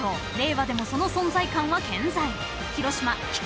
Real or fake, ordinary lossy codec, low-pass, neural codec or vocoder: real; none; none; none